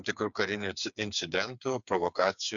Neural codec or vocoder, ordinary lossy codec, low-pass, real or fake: codec, 16 kHz, 4 kbps, FreqCodec, smaller model; MP3, 96 kbps; 7.2 kHz; fake